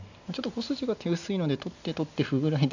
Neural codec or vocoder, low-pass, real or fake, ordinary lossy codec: none; 7.2 kHz; real; none